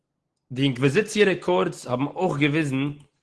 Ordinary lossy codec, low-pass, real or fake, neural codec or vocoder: Opus, 16 kbps; 10.8 kHz; real; none